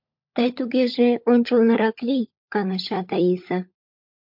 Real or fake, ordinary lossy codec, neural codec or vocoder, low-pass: fake; MP3, 48 kbps; codec, 16 kHz, 16 kbps, FunCodec, trained on LibriTTS, 50 frames a second; 5.4 kHz